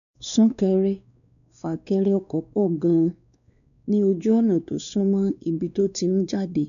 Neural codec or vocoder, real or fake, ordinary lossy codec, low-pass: codec, 16 kHz, 2 kbps, X-Codec, WavLM features, trained on Multilingual LibriSpeech; fake; none; 7.2 kHz